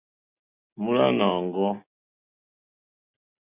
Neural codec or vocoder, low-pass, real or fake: none; 3.6 kHz; real